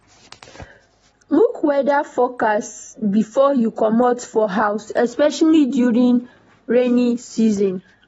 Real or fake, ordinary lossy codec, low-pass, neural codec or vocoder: real; AAC, 24 kbps; 19.8 kHz; none